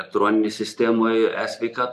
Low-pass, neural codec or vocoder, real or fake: 14.4 kHz; none; real